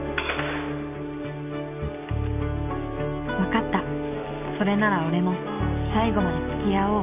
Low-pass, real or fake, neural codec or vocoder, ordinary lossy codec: 3.6 kHz; real; none; none